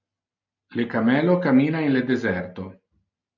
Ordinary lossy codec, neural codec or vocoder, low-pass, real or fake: MP3, 64 kbps; none; 7.2 kHz; real